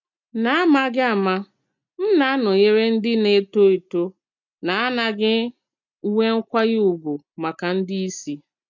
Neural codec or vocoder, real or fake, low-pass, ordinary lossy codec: none; real; 7.2 kHz; MP3, 64 kbps